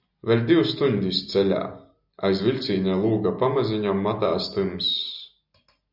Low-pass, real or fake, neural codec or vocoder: 5.4 kHz; real; none